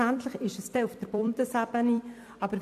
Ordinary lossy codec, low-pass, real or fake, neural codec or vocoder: AAC, 64 kbps; 14.4 kHz; fake; vocoder, 44.1 kHz, 128 mel bands every 256 samples, BigVGAN v2